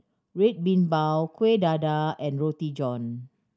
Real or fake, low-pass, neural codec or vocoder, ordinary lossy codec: real; none; none; none